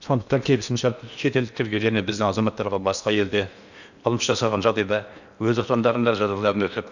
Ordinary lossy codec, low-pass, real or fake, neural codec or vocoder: none; 7.2 kHz; fake; codec, 16 kHz in and 24 kHz out, 0.8 kbps, FocalCodec, streaming, 65536 codes